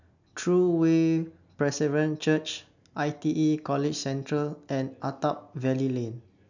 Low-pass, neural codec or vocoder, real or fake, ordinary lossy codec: 7.2 kHz; none; real; none